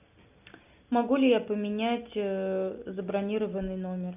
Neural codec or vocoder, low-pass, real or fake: none; 3.6 kHz; real